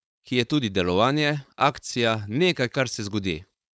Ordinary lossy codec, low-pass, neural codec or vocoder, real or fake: none; none; codec, 16 kHz, 4.8 kbps, FACodec; fake